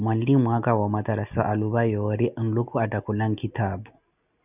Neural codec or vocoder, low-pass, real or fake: none; 3.6 kHz; real